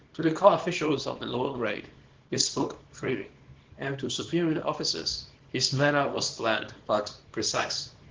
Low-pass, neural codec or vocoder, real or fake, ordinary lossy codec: 7.2 kHz; codec, 24 kHz, 0.9 kbps, WavTokenizer, small release; fake; Opus, 16 kbps